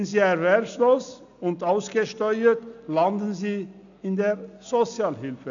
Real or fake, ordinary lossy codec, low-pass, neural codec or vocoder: real; none; 7.2 kHz; none